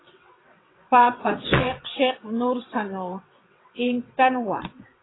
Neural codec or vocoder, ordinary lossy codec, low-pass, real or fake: vocoder, 22.05 kHz, 80 mel bands, WaveNeXt; AAC, 16 kbps; 7.2 kHz; fake